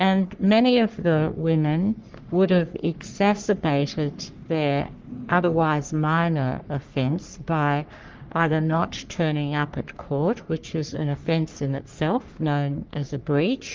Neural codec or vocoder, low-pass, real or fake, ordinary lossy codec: codec, 44.1 kHz, 3.4 kbps, Pupu-Codec; 7.2 kHz; fake; Opus, 32 kbps